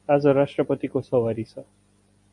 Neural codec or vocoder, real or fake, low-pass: none; real; 10.8 kHz